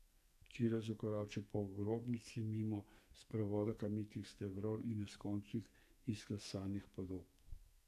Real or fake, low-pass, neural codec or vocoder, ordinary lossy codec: fake; 14.4 kHz; codec, 44.1 kHz, 2.6 kbps, SNAC; none